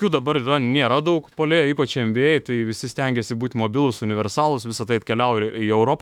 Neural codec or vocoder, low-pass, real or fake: autoencoder, 48 kHz, 32 numbers a frame, DAC-VAE, trained on Japanese speech; 19.8 kHz; fake